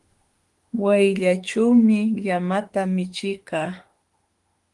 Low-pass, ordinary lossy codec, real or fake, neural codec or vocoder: 10.8 kHz; Opus, 24 kbps; fake; autoencoder, 48 kHz, 32 numbers a frame, DAC-VAE, trained on Japanese speech